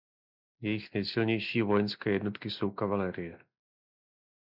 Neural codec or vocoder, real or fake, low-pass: none; real; 5.4 kHz